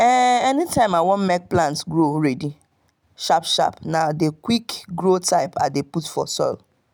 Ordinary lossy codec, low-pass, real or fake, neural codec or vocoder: none; none; real; none